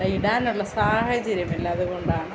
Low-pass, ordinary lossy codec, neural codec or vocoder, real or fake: none; none; none; real